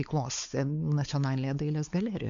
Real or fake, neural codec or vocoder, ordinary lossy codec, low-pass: fake; codec, 16 kHz, 4 kbps, X-Codec, WavLM features, trained on Multilingual LibriSpeech; AAC, 64 kbps; 7.2 kHz